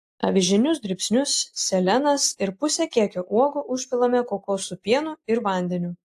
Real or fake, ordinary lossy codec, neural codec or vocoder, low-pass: real; AAC, 64 kbps; none; 14.4 kHz